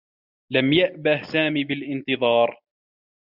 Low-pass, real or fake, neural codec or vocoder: 5.4 kHz; real; none